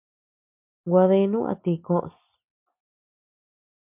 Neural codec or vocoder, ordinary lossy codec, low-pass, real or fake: none; MP3, 32 kbps; 3.6 kHz; real